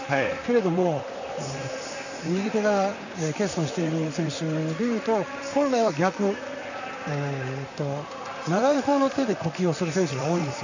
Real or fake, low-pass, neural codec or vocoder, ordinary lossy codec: fake; 7.2 kHz; vocoder, 44.1 kHz, 128 mel bands, Pupu-Vocoder; none